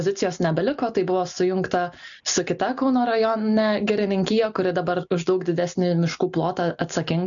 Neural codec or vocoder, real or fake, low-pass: none; real; 7.2 kHz